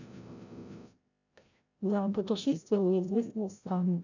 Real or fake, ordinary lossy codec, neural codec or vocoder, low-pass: fake; none; codec, 16 kHz, 0.5 kbps, FreqCodec, larger model; 7.2 kHz